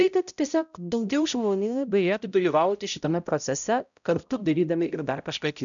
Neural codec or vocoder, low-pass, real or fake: codec, 16 kHz, 0.5 kbps, X-Codec, HuBERT features, trained on balanced general audio; 7.2 kHz; fake